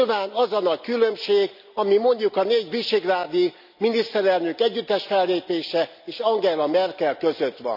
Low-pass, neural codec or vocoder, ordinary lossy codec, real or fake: 5.4 kHz; none; none; real